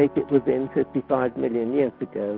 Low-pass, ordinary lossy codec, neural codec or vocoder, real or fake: 5.4 kHz; Opus, 24 kbps; none; real